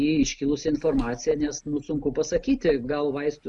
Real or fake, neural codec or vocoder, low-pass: real; none; 10.8 kHz